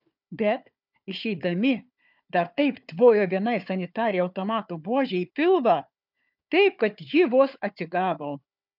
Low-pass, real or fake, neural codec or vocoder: 5.4 kHz; fake; codec, 16 kHz, 4 kbps, FunCodec, trained on Chinese and English, 50 frames a second